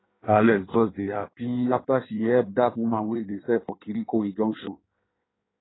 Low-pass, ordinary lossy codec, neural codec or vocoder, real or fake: 7.2 kHz; AAC, 16 kbps; codec, 16 kHz in and 24 kHz out, 1.1 kbps, FireRedTTS-2 codec; fake